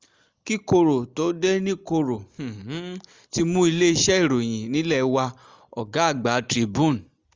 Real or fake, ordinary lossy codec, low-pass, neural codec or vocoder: real; Opus, 32 kbps; 7.2 kHz; none